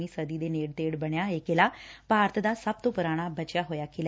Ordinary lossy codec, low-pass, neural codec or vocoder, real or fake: none; none; none; real